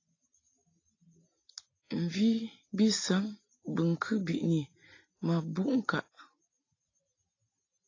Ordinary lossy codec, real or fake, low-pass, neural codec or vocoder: AAC, 32 kbps; real; 7.2 kHz; none